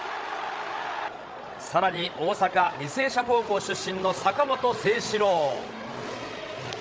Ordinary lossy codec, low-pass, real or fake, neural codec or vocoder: none; none; fake; codec, 16 kHz, 8 kbps, FreqCodec, larger model